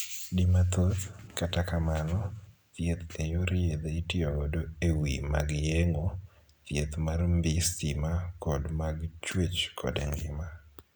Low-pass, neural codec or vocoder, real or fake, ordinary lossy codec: none; none; real; none